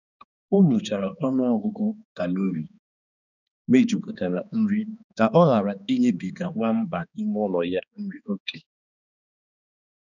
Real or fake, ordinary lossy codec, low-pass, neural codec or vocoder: fake; none; 7.2 kHz; codec, 16 kHz, 2 kbps, X-Codec, HuBERT features, trained on balanced general audio